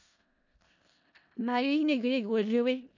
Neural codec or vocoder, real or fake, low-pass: codec, 16 kHz in and 24 kHz out, 0.4 kbps, LongCat-Audio-Codec, four codebook decoder; fake; 7.2 kHz